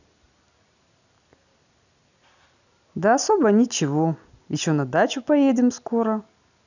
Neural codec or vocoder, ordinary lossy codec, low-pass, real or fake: none; none; 7.2 kHz; real